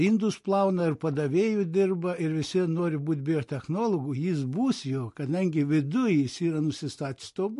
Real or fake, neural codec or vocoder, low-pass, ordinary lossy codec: real; none; 14.4 kHz; MP3, 48 kbps